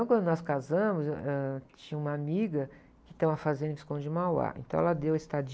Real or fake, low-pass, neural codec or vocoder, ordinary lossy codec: real; none; none; none